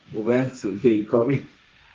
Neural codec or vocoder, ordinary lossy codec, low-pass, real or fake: codec, 16 kHz, 1.1 kbps, Voila-Tokenizer; Opus, 32 kbps; 7.2 kHz; fake